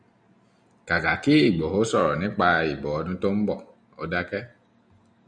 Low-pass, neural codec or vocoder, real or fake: 9.9 kHz; none; real